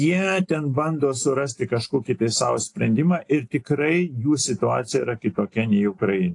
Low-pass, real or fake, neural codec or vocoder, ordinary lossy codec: 10.8 kHz; real; none; AAC, 48 kbps